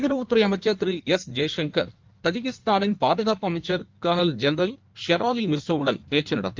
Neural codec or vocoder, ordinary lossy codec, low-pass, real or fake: codec, 16 kHz in and 24 kHz out, 1.1 kbps, FireRedTTS-2 codec; Opus, 24 kbps; 7.2 kHz; fake